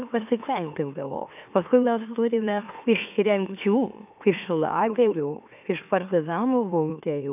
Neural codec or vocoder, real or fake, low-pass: autoencoder, 44.1 kHz, a latent of 192 numbers a frame, MeloTTS; fake; 3.6 kHz